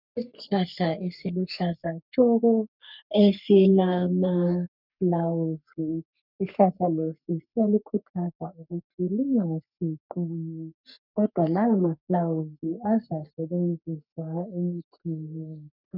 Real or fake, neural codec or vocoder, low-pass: fake; codec, 44.1 kHz, 3.4 kbps, Pupu-Codec; 5.4 kHz